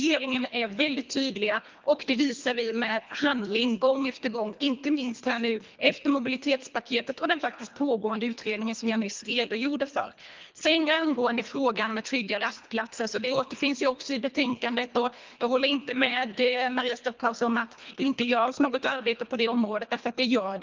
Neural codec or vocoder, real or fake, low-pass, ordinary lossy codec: codec, 24 kHz, 1.5 kbps, HILCodec; fake; 7.2 kHz; Opus, 24 kbps